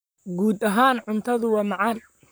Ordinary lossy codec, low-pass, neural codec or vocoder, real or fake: none; none; vocoder, 44.1 kHz, 128 mel bands, Pupu-Vocoder; fake